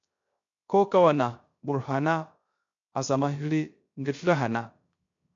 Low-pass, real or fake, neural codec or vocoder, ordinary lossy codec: 7.2 kHz; fake; codec, 16 kHz, 0.3 kbps, FocalCodec; MP3, 48 kbps